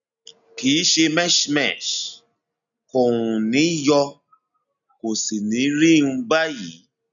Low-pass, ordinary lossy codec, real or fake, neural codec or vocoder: 7.2 kHz; none; real; none